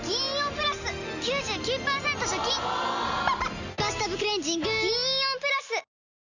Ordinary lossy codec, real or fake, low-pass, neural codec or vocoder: none; real; 7.2 kHz; none